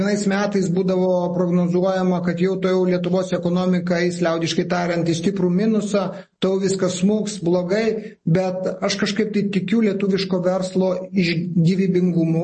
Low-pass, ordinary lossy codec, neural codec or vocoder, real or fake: 10.8 kHz; MP3, 32 kbps; none; real